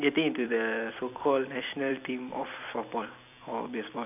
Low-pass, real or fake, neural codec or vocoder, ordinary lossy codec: 3.6 kHz; fake; vocoder, 44.1 kHz, 128 mel bands every 256 samples, BigVGAN v2; none